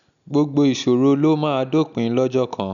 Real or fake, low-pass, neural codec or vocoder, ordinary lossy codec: real; 7.2 kHz; none; none